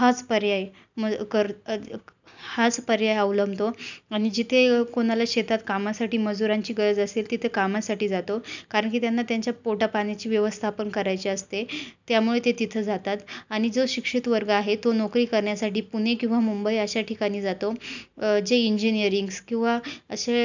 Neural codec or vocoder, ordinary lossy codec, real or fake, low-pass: none; none; real; 7.2 kHz